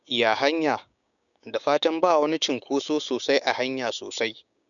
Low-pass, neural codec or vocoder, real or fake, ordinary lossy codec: 7.2 kHz; codec, 16 kHz, 6 kbps, DAC; fake; none